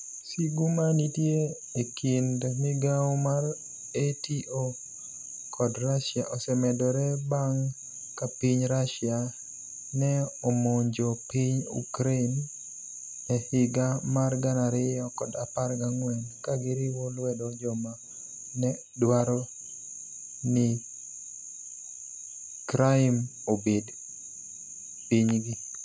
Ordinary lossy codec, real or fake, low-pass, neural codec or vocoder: none; real; none; none